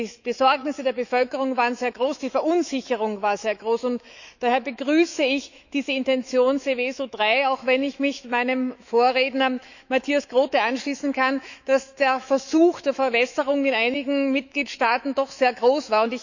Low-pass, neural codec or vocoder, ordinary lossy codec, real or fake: 7.2 kHz; autoencoder, 48 kHz, 128 numbers a frame, DAC-VAE, trained on Japanese speech; none; fake